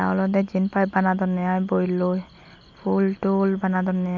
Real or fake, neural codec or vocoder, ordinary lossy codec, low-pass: real; none; none; 7.2 kHz